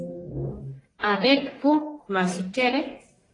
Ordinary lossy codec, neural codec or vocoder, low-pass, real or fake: AAC, 32 kbps; codec, 44.1 kHz, 1.7 kbps, Pupu-Codec; 10.8 kHz; fake